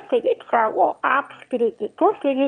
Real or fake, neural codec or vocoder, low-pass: fake; autoencoder, 22.05 kHz, a latent of 192 numbers a frame, VITS, trained on one speaker; 9.9 kHz